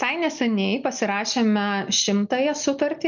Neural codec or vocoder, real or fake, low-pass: none; real; 7.2 kHz